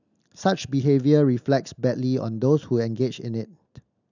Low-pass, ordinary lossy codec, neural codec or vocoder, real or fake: 7.2 kHz; none; none; real